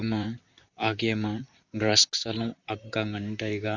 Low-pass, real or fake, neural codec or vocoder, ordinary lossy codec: 7.2 kHz; fake; vocoder, 44.1 kHz, 128 mel bands, Pupu-Vocoder; Opus, 64 kbps